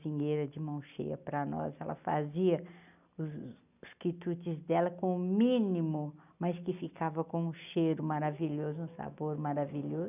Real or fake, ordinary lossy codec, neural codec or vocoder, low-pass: real; none; none; 3.6 kHz